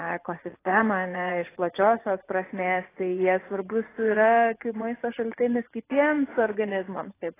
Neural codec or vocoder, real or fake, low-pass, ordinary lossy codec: vocoder, 24 kHz, 100 mel bands, Vocos; fake; 3.6 kHz; AAC, 16 kbps